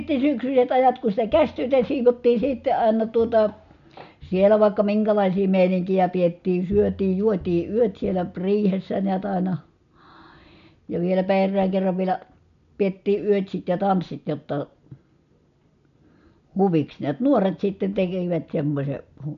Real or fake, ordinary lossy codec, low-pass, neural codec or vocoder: real; none; 7.2 kHz; none